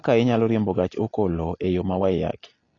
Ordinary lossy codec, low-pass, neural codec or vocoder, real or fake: AAC, 32 kbps; 7.2 kHz; none; real